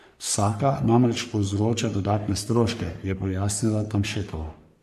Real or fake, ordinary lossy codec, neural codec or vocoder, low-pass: fake; MP3, 64 kbps; codec, 44.1 kHz, 3.4 kbps, Pupu-Codec; 14.4 kHz